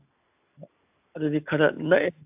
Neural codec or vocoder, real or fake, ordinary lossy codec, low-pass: none; real; AAC, 32 kbps; 3.6 kHz